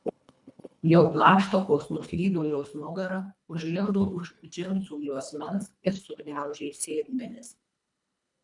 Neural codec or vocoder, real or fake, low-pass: codec, 24 kHz, 1.5 kbps, HILCodec; fake; 10.8 kHz